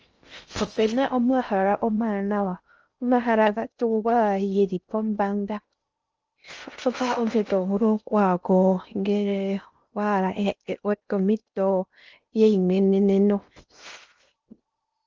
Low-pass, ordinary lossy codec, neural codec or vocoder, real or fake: 7.2 kHz; Opus, 24 kbps; codec, 16 kHz in and 24 kHz out, 0.6 kbps, FocalCodec, streaming, 4096 codes; fake